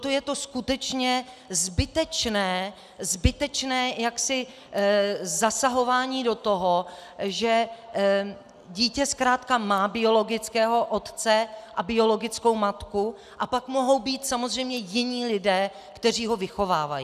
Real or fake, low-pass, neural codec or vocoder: real; 14.4 kHz; none